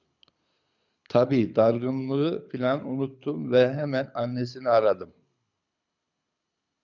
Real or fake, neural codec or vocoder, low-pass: fake; codec, 24 kHz, 6 kbps, HILCodec; 7.2 kHz